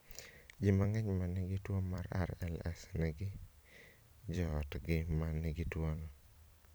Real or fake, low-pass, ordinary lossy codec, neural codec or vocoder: fake; none; none; vocoder, 44.1 kHz, 128 mel bands every 256 samples, BigVGAN v2